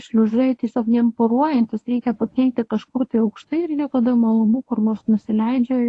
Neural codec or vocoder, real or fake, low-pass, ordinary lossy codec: codec, 24 kHz, 0.9 kbps, WavTokenizer, medium speech release version 1; fake; 10.8 kHz; AAC, 48 kbps